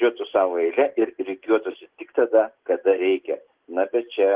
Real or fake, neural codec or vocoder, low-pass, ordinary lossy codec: real; none; 3.6 kHz; Opus, 16 kbps